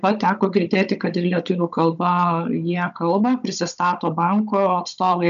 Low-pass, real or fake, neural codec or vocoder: 7.2 kHz; fake; codec, 16 kHz, 16 kbps, FunCodec, trained on Chinese and English, 50 frames a second